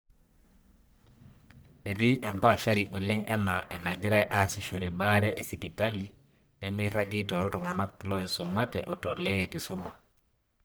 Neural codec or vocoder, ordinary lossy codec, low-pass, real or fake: codec, 44.1 kHz, 1.7 kbps, Pupu-Codec; none; none; fake